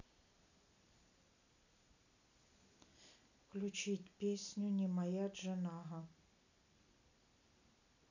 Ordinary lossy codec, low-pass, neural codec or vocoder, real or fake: none; 7.2 kHz; none; real